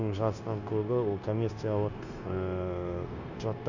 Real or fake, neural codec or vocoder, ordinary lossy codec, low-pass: fake; codec, 16 kHz, 0.9 kbps, LongCat-Audio-Codec; none; 7.2 kHz